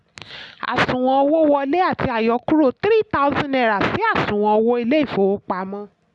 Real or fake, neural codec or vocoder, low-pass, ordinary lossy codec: real; none; 10.8 kHz; none